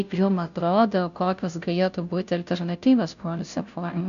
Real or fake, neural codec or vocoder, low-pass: fake; codec, 16 kHz, 0.5 kbps, FunCodec, trained on Chinese and English, 25 frames a second; 7.2 kHz